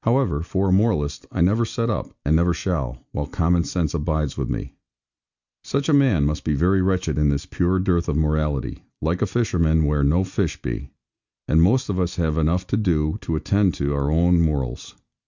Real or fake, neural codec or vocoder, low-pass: real; none; 7.2 kHz